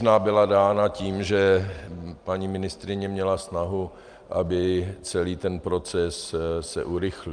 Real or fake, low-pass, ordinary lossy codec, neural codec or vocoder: real; 9.9 kHz; Opus, 32 kbps; none